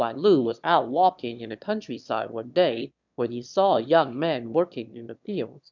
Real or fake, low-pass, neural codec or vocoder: fake; 7.2 kHz; autoencoder, 22.05 kHz, a latent of 192 numbers a frame, VITS, trained on one speaker